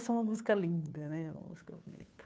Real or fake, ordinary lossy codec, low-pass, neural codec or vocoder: fake; none; none; codec, 16 kHz, 2 kbps, FunCodec, trained on Chinese and English, 25 frames a second